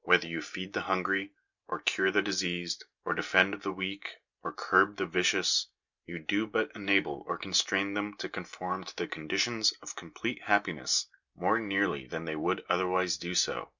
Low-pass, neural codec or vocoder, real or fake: 7.2 kHz; none; real